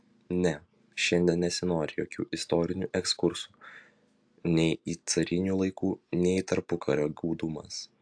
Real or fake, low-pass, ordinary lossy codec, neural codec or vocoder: real; 9.9 kHz; AAC, 64 kbps; none